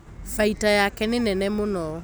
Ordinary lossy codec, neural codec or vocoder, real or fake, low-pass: none; none; real; none